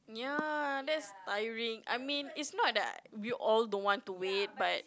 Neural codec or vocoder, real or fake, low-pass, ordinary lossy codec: none; real; none; none